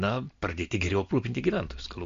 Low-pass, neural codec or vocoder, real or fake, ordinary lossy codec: 7.2 kHz; none; real; MP3, 48 kbps